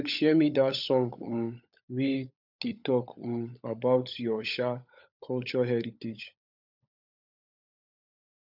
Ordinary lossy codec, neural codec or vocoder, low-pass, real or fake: none; codec, 16 kHz, 16 kbps, FunCodec, trained on LibriTTS, 50 frames a second; 5.4 kHz; fake